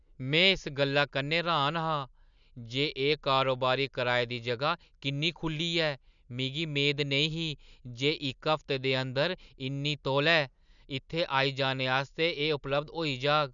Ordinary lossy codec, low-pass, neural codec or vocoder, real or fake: none; 7.2 kHz; none; real